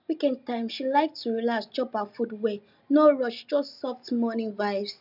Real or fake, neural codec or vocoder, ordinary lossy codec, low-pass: real; none; none; 5.4 kHz